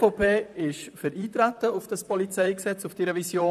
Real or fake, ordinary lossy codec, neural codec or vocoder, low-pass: fake; none; vocoder, 44.1 kHz, 128 mel bands, Pupu-Vocoder; 14.4 kHz